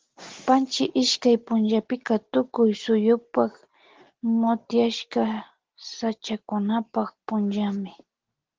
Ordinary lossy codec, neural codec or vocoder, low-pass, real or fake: Opus, 16 kbps; none; 7.2 kHz; real